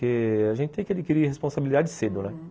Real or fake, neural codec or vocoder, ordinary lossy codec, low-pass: real; none; none; none